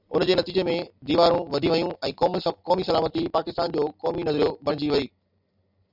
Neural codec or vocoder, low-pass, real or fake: none; 5.4 kHz; real